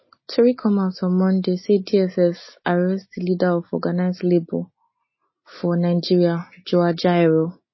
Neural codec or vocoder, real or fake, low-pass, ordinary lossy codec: none; real; 7.2 kHz; MP3, 24 kbps